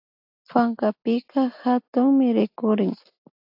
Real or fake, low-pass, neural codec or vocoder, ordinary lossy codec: real; 5.4 kHz; none; AAC, 48 kbps